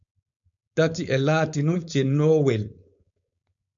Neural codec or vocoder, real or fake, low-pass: codec, 16 kHz, 4.8 kbps, FACodec; fake; 7.2 kHz